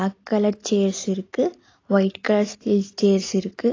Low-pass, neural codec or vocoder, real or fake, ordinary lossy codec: 7.2 kHz; none; real; AAC, 32 kbps